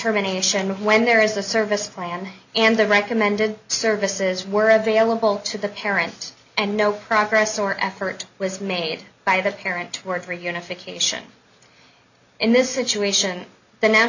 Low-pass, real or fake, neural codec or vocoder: 7.2 kHz; real; none